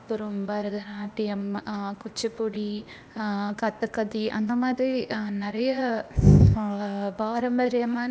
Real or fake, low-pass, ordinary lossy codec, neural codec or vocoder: fake; none; none; codec, 16 kHz, 0.8 kbps, ZipCodec